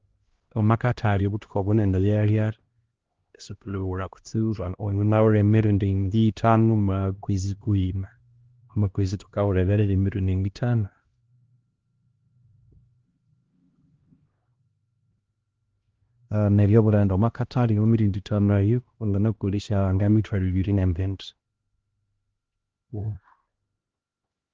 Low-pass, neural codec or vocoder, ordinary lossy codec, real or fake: 7.2 kHz; codec, 16 kHz, 1 kbps, X-Codec, HuBERT features, trained on LibriSpeech; Opus, 16 kbps; fake